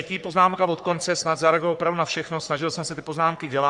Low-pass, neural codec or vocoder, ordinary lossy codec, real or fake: 10.8 kHz; codec, 44.1 kHz, 3.4 kbps, Pupu-Codec; Opus, 64 kbps; fake